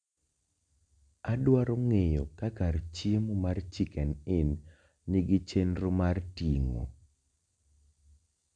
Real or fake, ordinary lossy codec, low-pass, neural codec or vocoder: real; none; 9.9 kHz; none